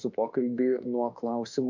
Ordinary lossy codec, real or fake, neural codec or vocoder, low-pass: MP3, 64 kbps; fake; autoencoder, 48 kHz, 32 numbers a frame, DAC-VAE, trained on Japanese speech; 7.2 kHz